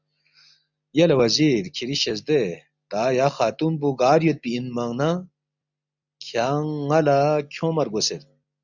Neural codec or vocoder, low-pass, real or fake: none; 7.2 kHz; real